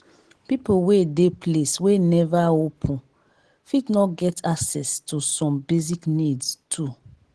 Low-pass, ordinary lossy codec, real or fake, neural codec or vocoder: 10.8 kHz; Opus, 16 kbps; real; none